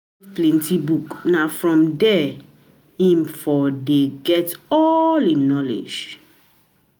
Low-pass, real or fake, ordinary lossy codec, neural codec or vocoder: none; real; none; none